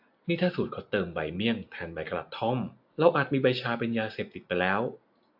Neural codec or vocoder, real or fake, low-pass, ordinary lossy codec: none; real; 5.4 kHz; AAC, 48 kbps